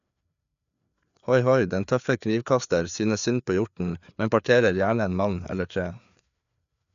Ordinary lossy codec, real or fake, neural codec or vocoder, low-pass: none; fake; codec, 16 kHz, 4 kbps, FreqCodec, larger model; 7.2 kHz